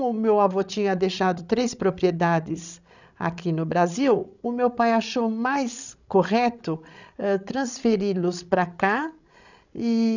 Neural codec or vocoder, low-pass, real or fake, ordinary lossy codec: codec, 16 kHz, 16 kbps, FreqCodec, larger model; 7.2 kHz; fake; none